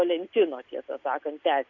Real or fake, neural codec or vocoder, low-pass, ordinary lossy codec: real; none; 7.2 kHz; MP3, 48 kbps